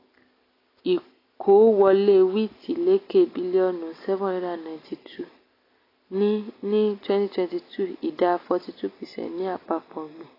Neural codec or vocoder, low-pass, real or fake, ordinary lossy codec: none; 5.4 kHz; real; AAC, 24 kbps